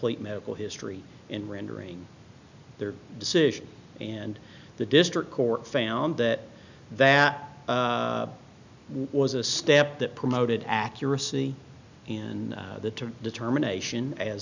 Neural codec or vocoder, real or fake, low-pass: none; real; 7.2 kHz